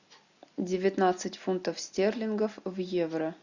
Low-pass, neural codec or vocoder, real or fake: 7.2 kHz; none; real